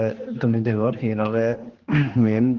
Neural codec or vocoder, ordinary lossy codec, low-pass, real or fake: codec, 16 kHz, 2 kbps, X-Codec, HuBERT features, trained on general audio; Opus, 16 kbps; 7.2 kHz; fake